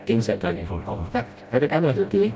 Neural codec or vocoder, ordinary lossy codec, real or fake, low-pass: codec, 16 kHz, 0.5 kbps, FreqCodec, smaller model; none; fake; none